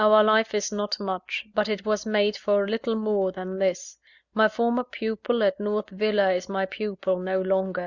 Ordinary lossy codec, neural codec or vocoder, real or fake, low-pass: Opus, 64 kbps; none; real; 7.2 kHz